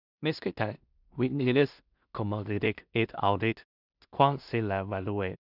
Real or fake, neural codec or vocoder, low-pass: fake; codec, 16 kHz in and 24 kHz out, 0.4 kbps, LongCat-Audio-Codec, two codebook decoder; 5.4 kHz